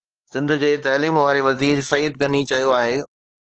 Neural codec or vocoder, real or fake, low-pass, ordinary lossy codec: codec, 16 kHz, 2 kbps, X-Codec, HuBERT features, trained on LibriSpeech; fake; 7.2 kHz; Opus, 16 kbps